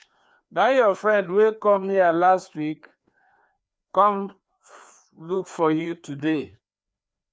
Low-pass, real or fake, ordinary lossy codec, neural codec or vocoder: none; fake; none; codec, 16 kHz, 2 kbps, FreqCodec, larger model